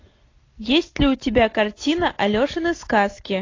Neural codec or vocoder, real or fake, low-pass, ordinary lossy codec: none; real; 7.2 kHz; AAC, 32 kbps